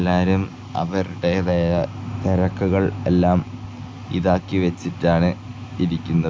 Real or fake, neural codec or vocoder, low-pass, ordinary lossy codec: real; none; none; none